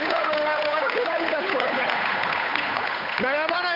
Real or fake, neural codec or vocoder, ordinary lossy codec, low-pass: fake; codec, 16 kHz, 8 kbps, FunCodec, trained on Chinese and English, 25 frames a second; none; 5.4 kHz